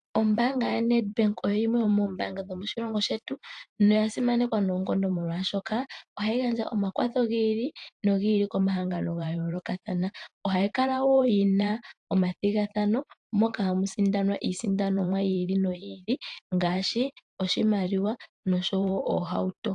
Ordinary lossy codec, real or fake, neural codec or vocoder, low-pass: AAC, 64 kbps; fake; vocoder, 44.1 kHz, 128 mel bands every 512 samples, BigVGAN v2; 10.8 kHz